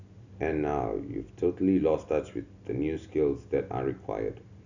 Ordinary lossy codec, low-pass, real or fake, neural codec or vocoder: none; 7.2 kHz; real; none